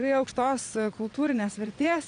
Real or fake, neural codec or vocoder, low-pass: fake; vocoder, 22.05 kHz, 80 mel bands, Vocos; 9.9 kHz